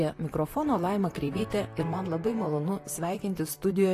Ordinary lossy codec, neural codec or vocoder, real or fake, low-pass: AAC, 48 kbps; vocoder, 44.1 kHz, 128 mel bands, Pupu-Vocoder; fake; 14.4 kHz